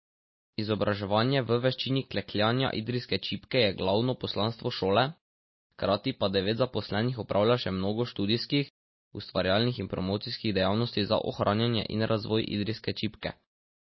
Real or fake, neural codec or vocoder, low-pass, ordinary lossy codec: real; none; 7.2 kHz; MP3, 24 kbps